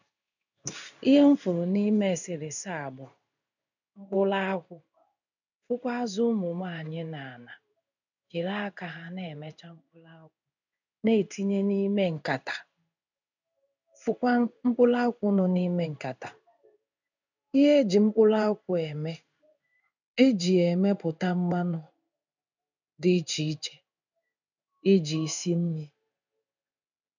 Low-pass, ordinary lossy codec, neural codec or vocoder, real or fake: 7.2 kHz; none; codec, 16 kHz in and 24 kHz out, 1 kbps, XY-Tokenizer; fake